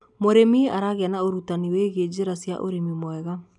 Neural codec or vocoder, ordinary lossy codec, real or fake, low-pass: none; none; real; 10.8 kHz